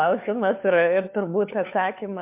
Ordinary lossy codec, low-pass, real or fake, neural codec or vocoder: MP3, 32 kbps; 3.6 kHz; fake; codec, 16 kHz, 4 kbps, FunCodec, trained on LibriTTS, 50 frames a second